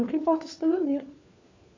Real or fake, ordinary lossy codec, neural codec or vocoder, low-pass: fake; AAC, 48 kbps; codec, 16 kHz, 4 kbps, FunCodec, trained on LibriTTS, 50 frames a second; 7.2 kHz